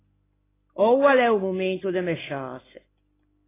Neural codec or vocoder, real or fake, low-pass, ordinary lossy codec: none; real; 3.6 kHz; AAC, 16 kbps